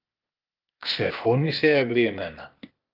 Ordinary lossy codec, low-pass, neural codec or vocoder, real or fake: Opus, 32 kbps; 5.4 kHz; codec, 16 kHz, 0.8 kbps, ZipCodec; fake